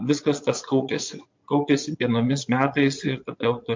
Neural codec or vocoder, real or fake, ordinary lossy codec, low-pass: vocoder, 22.05 kHz, 80 mel bands, Vocos; fake; MP3, 48 kbps; 7.2 kHz